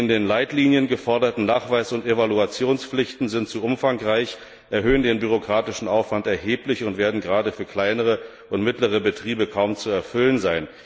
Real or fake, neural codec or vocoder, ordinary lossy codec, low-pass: real; none; none; none